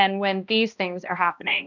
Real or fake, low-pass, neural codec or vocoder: fake; 7.2 kHz; codec, 16 kHz, 1 kbps, X-Codec, HuBERT features, trained on balanced general audio